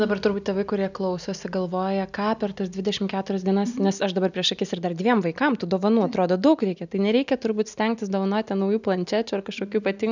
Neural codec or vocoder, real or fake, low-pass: none; real; 7.2 kHz